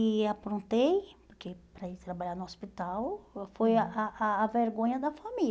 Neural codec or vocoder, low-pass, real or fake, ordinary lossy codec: none; none; real; none